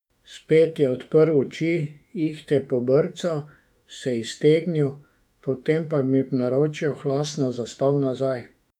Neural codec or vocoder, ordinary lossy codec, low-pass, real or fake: autoencoder, 48 kHz, 32 numbers a frame, DAC-VAE, trained on Japanese speech; none; 19.8 kHz; fake